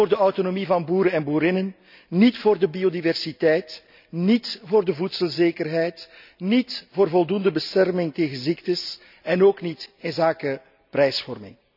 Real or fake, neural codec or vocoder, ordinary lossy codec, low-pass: real; none; none; 5.4 kHz